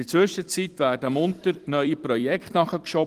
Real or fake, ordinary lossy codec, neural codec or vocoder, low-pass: fake; Opus, 24 kbps; vocoder, 44.1 kHz, 128 mel bands every 256 samples, BigVGAN v2; 14.4 kHz